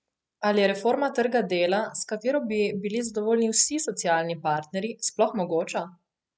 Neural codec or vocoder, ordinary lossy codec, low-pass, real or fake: none; none; none; real